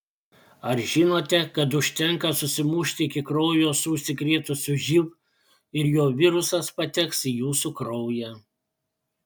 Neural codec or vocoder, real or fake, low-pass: none; real; 19.8 kHz